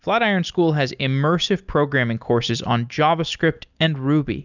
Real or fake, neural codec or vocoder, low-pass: real; none; 7.2 kHz